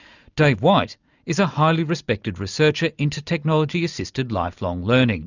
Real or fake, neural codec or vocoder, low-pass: real; none; 7.2 kHz